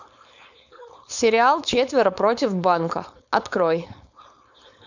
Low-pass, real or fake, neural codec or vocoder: 7.2 kHz; fake; codec, 16 kHz, 4.8 kbps, FACodec